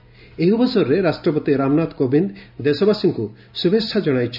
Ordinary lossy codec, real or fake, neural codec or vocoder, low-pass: none; real; none; 5.4 kHz